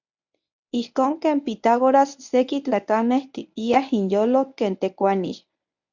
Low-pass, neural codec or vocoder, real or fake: 7.2 kHz; codec, 24 kHz, 0.9 kbps, WavTokenizer, medium speech release version 1; fake